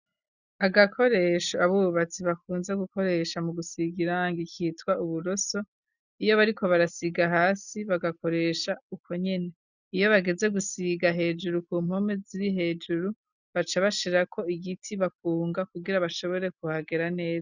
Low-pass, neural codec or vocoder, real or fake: 7.2 kHz; none; real